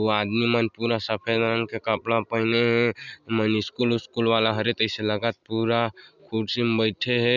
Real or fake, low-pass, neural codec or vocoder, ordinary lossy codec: real; 7.2 kHz; none; none